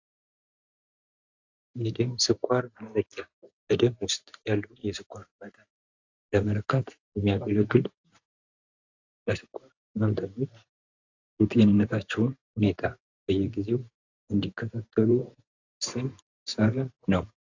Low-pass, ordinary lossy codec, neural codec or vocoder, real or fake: 7.2 kHz; AAC, 48 kbps; vocoder, 44.1 kHz, 128 mel bands every 512 samples, BigVGAN v2; fake